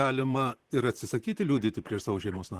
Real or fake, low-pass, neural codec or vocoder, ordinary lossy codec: fake; 14.4 kHz; vocoder, 44.1 kHz, 128 mel bands, Pupu-Vocoder; Opus, 16 kbps